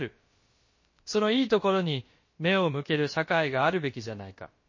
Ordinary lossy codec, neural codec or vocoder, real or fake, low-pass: MP3, 32 kbps; codec, 16 kHz, about 1 kbps, DyCAST, with the encoder's durations; fake; 7.2 kHz